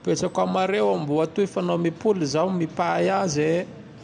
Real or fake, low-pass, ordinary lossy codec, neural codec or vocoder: real; 10.8 kHz; none; none